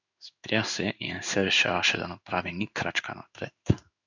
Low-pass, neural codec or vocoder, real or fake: 7.2 kHz; codec, 16 kHz in and 24 kHz out, 1 kbps, XY-Tokenizer; fake